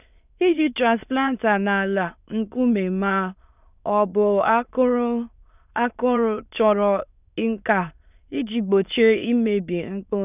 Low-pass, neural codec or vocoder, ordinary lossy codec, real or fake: 3.6 kHz; autoencoder, 22.05 kHz, a latent of 192 numbers a frame, VITS, trained on many speakers; none; fake